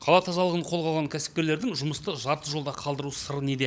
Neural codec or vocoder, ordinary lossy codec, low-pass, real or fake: codec, 16 kHz, 16 kbps, FunCodec, trained on LibriTTS, 50 frames a second; none; none; fake